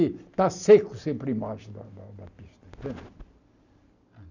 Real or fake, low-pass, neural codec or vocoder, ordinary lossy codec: real; 7.2 kHz; none; none